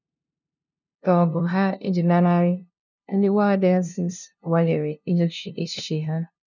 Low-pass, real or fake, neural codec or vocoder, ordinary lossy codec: 7.2 kHz; fake; codec, 16 kHz, 0.5 kbps, FunCodec, trained on LibriTTS, 25 frames a second; none